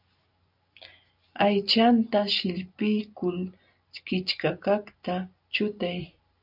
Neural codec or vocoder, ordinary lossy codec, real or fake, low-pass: none; AAC, 48 kbps; real; 5.4 kHz